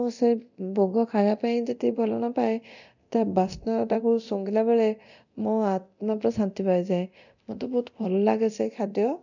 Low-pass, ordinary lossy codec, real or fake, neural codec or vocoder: 7.2 kHz; none; fake; codec, 24 kHz, 0.9 kbps, DualCodec